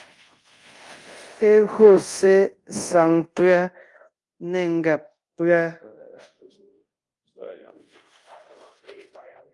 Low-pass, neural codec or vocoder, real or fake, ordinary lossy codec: 10.8 kHz; codec, 24 kHz, 0.5 kbps, DualCodec; fake; Opus, 32 kbps